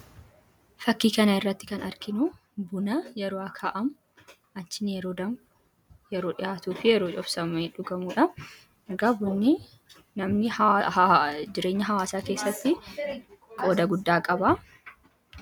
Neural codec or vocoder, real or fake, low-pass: none; real; 19.8 kHz